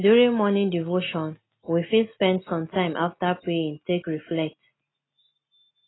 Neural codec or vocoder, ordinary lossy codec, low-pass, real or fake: none; AAC, 16 kbps; 7.2 kHz; real